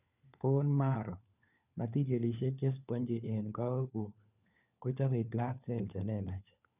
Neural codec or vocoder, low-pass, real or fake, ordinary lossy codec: codec, 16 kHz, 4 kbps, FunCodec, trained on LibriTTS, 50 frames a second; 3.6 kHz; fake; none